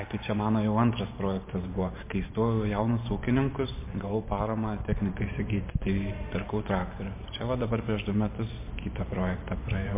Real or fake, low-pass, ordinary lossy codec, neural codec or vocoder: fake; 3.6 kHz; MP3, 24 kbps; vocoder, 44.1 kHz, 128 mel bands every 512 samples, BigVGAN v2